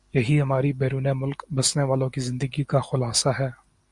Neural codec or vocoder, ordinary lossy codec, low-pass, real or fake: none; Opus, 64 kbps; 10.8 kHz; real